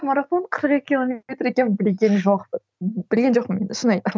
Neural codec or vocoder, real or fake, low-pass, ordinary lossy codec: none; real; none; none